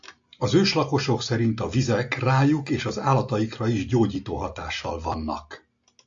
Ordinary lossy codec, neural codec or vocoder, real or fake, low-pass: AAC, 64 kbps; none; real; 7.2 kHz